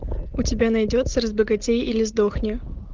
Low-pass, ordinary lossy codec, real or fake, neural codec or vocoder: 7.2 kHz; Opus, 32 kbps; real; none